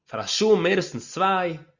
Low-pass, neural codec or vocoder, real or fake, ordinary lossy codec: 7.2 kHz; none; real; Opus, 64 kbps